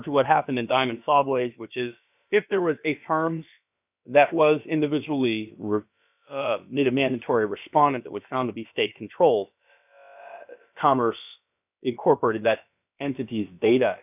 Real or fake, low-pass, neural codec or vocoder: fake; 3.6 kHz; codec, 16 kHz, about 1 kbps, DyCAST, with the encoder's durations